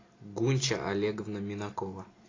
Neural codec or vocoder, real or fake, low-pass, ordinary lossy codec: none; real; 7.2 kHz; AAC, 32 kbps